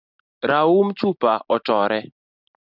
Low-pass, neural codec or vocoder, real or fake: 5.4 kHz; none; real